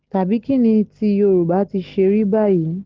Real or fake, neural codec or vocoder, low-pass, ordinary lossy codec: real; none; 7.2 kHz; Opus, 16 kbps